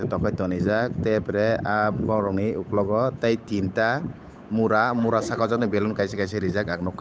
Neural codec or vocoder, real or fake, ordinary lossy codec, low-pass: codec, 16 kHz, 8 kbps, FunCodec, trained on Chinese and English, 25 frames a second; fake; none; none